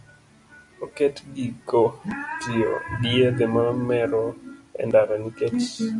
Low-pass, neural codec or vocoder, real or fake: 10.8 kHz; none; real